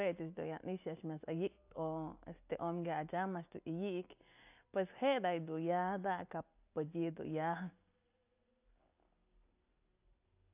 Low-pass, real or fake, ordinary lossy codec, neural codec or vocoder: 3.6 kHz; real; AAC, 32 kbps; none